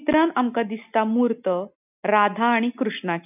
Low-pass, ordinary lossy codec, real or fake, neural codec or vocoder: 3.6 kHz; none; real; none